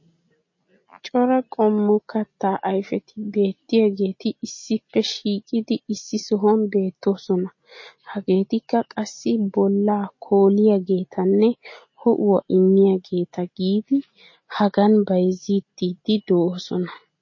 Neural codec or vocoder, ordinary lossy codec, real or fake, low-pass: none; MP3, 32 kbps; real; 7.2 kHz